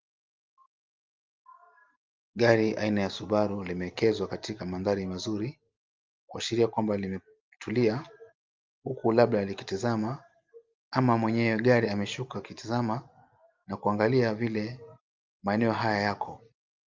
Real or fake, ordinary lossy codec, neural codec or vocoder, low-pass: real; Opus, 24 kbps; none; 7.2 kHz